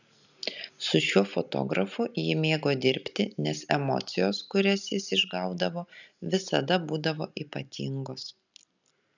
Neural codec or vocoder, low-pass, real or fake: none; 7.2 kHz; real